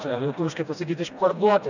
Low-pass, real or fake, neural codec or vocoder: 7.2 kHz; fake; codec, 16 kHz, 1 kbps, FreqCodec, smaller model